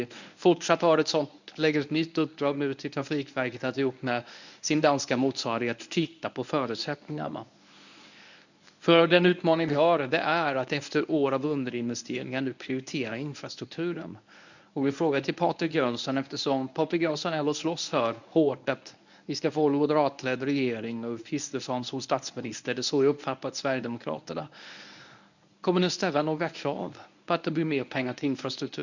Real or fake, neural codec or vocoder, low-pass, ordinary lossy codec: fake; codec, 24 kHz, 0.9 kbps, WavTokenizer, medium speech release version 1; 7.2 kHz; none